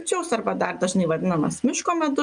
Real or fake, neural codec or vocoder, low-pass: real; none; 9.9 kHz